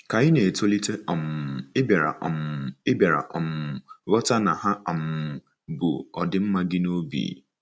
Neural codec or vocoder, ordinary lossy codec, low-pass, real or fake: none; none; none; real